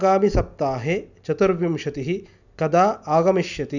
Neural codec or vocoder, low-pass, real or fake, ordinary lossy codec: none; 7.2 kHz; real; none